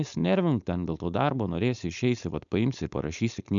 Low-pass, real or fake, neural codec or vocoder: 7.2 kHz; fake; codec, 16 kHz, 4.8 kbps, FACodec